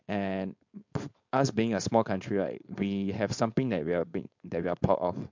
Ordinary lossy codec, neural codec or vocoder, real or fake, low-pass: MP3, 48 kbps; codec, 16 kHz, 4.8 kbps, FACodec; fake; 7.2 kHz